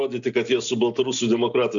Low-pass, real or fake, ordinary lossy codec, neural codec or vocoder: 7.2 kHz; real; MP3, 64 kbps; none